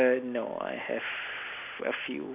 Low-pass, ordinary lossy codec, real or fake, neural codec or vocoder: 3.6 kHz; none; real; none